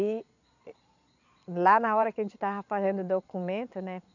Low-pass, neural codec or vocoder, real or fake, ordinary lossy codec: 7.2 kHz; vocoder, 22.05 kHz, 80 mel bands, Vocos; fake; none